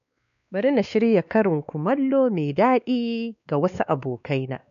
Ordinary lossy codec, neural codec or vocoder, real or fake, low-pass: none; codec, 16 kHz, 2 kbps, X-Codec, WavLM features, trained on Multilingual LibriSpeech; fake; 7.2 kHz